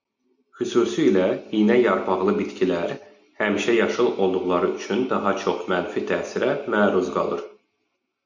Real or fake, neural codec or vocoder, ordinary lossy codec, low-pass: real; none; MP3, 64 kbps; 7.2 kHz